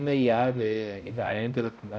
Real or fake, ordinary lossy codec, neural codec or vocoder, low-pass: fake; none; codec, 16 kHz, 0.5 kbps, X-Codec, HuBERT features, trained on balanced general audio; none